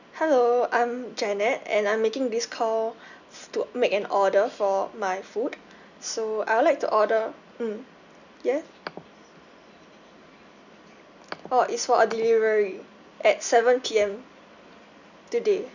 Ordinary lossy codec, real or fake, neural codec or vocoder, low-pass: none; real; none; 7.2 kHz